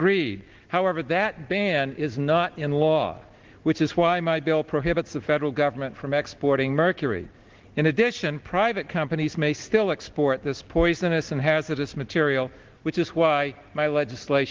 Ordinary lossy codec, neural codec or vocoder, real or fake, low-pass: Opus, 16 kbps; none; real; 7.2 kHz